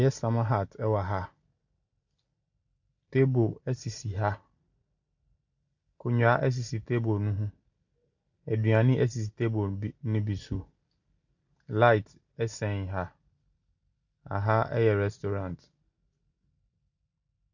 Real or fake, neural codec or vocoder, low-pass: real; none; 7.2 kHz